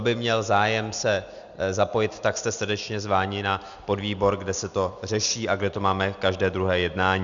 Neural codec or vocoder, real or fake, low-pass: none; real; 7.2 kHz